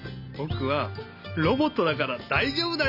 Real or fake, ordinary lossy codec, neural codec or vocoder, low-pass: real; none; none; 5.4 kHz